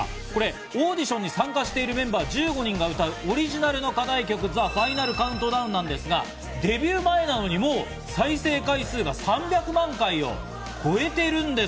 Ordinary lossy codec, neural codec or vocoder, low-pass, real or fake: none; none; none; real